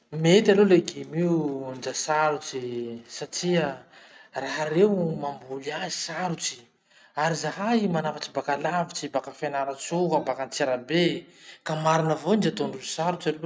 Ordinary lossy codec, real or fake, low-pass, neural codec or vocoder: none; real; none; none